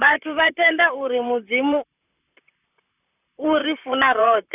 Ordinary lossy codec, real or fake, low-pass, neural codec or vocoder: none; fake; 3.6 kHz; vocoder, 44.1 kHz, 128 mel bands, Pupu-Vocoder